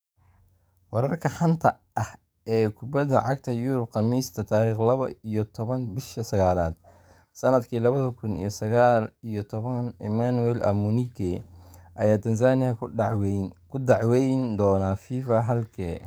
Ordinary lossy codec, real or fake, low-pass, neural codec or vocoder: none; fake; none; codec, 44.1 kHz, 7.8 kbps, DAC